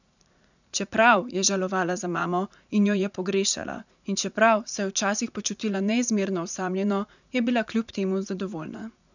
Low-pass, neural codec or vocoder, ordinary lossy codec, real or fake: 7.2 kHz; vocoder, 44.1 kHz, 80 mel bands, Vocos; none; fake